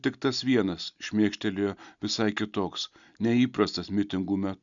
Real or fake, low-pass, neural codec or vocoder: real; 7.2 kHz; none